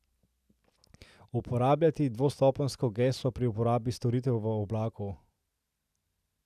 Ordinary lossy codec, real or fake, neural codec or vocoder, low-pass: none; real; none; 14.4 kHz